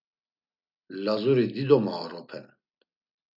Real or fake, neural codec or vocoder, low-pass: real; none; 5.4 kHz